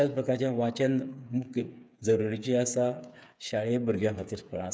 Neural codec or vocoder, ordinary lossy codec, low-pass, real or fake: codec, 16 kHz, 8 kbps, FreqCodec, smaller model; none; none; fake